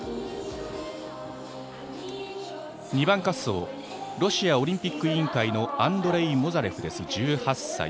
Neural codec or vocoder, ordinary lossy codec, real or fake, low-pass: none; none; real; none